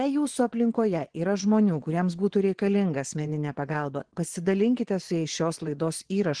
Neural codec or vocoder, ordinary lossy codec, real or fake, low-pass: vocoder, 24 kHz, 100 mel bands, Vocos; Opus, 16 kbps; fake; 9.9 kHz